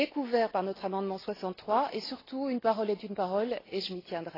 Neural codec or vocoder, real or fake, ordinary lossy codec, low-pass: none; real; AAC, 24 kbps; 5.4 kHz